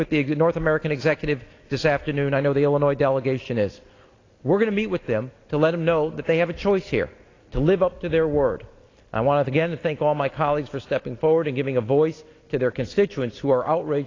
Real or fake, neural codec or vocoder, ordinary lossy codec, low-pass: real; none; AAC, 32 kbps; 7.2 kHz